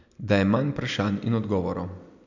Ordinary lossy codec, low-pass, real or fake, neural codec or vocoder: none; 7.2 kHz; real; none